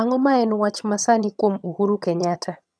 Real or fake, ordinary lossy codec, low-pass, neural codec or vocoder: fake; none; none; vocoder, 22.05 kHz, 80 mel bands, HiFi-GAN